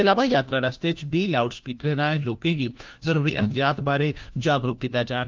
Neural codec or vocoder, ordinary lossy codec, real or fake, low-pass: codec, 16 kHz, 1 kbps, FunCodec, trained on LibriTTS, 50 frames a second; Opus, 24 kbps; fake; 7.2 kHz